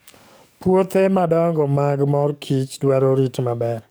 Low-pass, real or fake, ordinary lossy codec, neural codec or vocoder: none; fake; none; codec, 44.1 kHz, 7.8 kbps, DAC